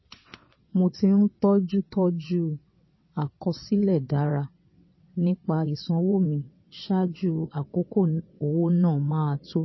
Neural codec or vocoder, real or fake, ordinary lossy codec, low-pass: vocoder, 22.05 kHz, 80 mel bands, WaveNeXt; fake; MP3, 24 kbps; 7.2 kHz